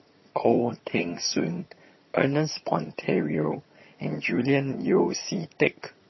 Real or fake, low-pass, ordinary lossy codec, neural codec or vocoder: fake; 7.2 kHz; MP3, 24 kbps; vocoder, 22.05 kHz, 80 mel bands, HiFi-GAN